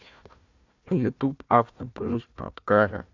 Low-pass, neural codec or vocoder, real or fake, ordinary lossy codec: 7.2 kHz; codec, 16 kHz, 1 kbps, FunCodec, trained on Chinese and English, 50 frames a second; fake; MP3, 64 kbps